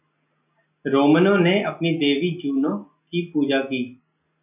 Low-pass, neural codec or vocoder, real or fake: 3.6 kHz; none; real